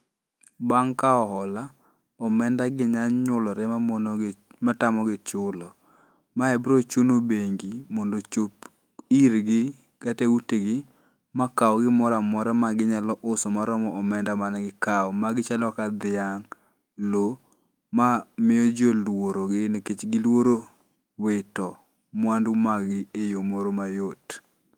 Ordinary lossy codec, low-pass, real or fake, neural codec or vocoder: Opus, 32 kbps; 19.8 kHz; fake; autoencoder, 48 kHz, 128 numbers a frame, DAC-VAE, trained on Japanese speech